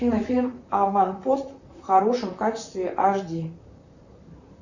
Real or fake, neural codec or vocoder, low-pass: fake; vocoder, 44.1 kHz, 128 mel bands, Pupu-Vocoder; 7.2 kHz